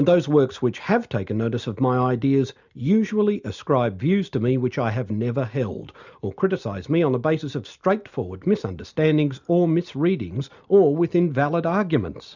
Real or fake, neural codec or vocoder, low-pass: real; none; 7.2 kHz